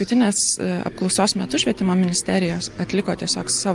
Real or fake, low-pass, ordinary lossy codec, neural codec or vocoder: real; 10.8 kHz; Opus, 32 kbps; none